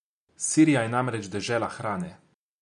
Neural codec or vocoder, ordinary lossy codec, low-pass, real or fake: none; none; 10.8 kHz; real